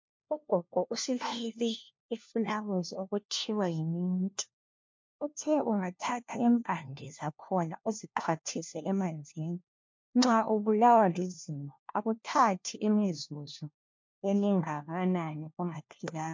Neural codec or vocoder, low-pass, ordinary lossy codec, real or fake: codec, 16 kHz, 1 kbps, FunCodec, trained on LibriTTS, 50 frames a second; 7.2 kHz; MP3, 48 kbps; fake